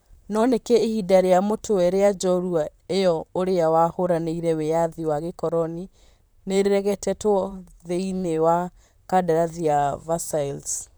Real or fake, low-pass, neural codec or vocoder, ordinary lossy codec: fake; none; vocoder, 44.1 kHz, 128 mel bands, Pupu-Vocoder; none